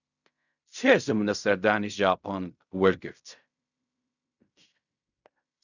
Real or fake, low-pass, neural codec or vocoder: fake; 7.2 kHz; codec, 16 kHz in and 24 kHz out, 0.4 kbps, LongCat-Audio-Codec, fine tuned four codebook decoder